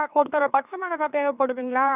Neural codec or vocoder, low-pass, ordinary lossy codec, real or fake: autoencoder, 44.1 kHz, a latent of 192 numbers a frame, MeloTTS; 3.6 kHz; none; fake